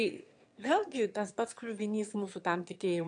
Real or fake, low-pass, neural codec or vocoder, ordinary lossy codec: fake; 9.9 kHz; autoencoder, 22.05 kHz, a latent of 192 numbers a frame, VITS, trained on one speaker; AAC, 64 kbps